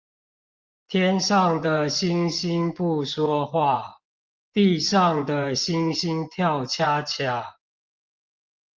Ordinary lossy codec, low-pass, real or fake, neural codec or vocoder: Opus, 32 kbps; 7.2 kHz; fake; vocoder, 24 kHz, 100 mel bands, Vocos